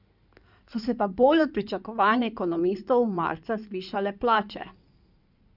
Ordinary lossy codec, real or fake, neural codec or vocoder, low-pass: none; fake; codec, 16 kHz in and 24 kHz out, 2.2 kbps, FireRedTTS-2 codec; 5.4 kHz